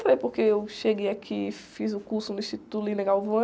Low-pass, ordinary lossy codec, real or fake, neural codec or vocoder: none; none; real; none